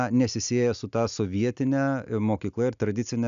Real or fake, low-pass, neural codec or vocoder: real; 7.2 kHz; none